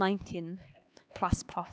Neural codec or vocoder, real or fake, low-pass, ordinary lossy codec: codec, 16 kHz, 2 kbps, X-Codec, HuBERT features, trained on LibriSpeech; fake; none; none